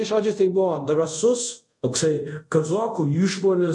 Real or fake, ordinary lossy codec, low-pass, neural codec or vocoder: fake; MP3, 64 kbps; 10.8 kHz; codec, 24 kHz, 0.5 kbps, DualCodec